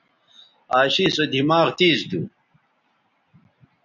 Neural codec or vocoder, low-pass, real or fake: none; 7.2 kHz; real